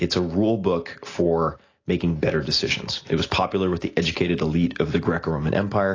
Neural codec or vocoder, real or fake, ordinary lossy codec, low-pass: none; real; AAC, 32 kbps; 7.2 kHz